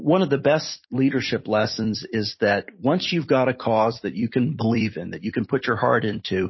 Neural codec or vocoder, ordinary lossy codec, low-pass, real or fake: vocoder, 44.1 kHz, 128 mel bands every 256 samples, BigVGAN v2; MP3, 24 kbps; 7.2 kHz; fake